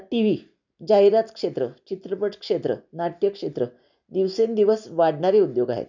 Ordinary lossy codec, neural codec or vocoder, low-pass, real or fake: none; none; 7.2 kHz; real